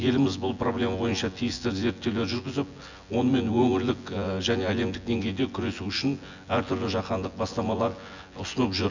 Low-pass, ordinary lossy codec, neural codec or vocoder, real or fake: 7.2 kHz; none; vocoder, 24 kHz, 100 mel bands, Vocos; fake